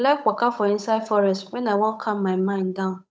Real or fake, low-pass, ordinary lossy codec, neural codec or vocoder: fake; none; none; codec, 16 kHz, 8 kbps, FunCodec, trained on Chinese and English, 25 frames a second